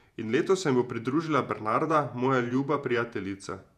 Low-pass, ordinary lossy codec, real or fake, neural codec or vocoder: 14.4 kHz; none; fake; vocoder, 48 kHz, 128 mel bands, Vocos